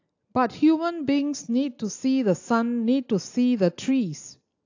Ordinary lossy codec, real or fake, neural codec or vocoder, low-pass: AAC, 48 kbps; real; none; 7.2 kHz